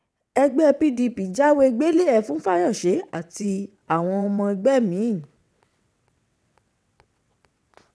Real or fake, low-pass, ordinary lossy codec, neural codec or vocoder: fake; none; none; vocoder, 22.05 kHz, 80 mel bands, WaveNeXt